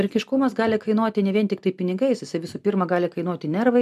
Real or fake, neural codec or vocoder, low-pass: real; none; 14.4 kHz